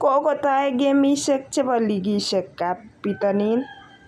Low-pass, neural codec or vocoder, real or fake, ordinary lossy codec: 14.4 kHz; none; real; none